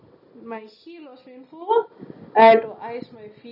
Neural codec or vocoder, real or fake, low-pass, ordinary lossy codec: none; real; 5.4 kHz; none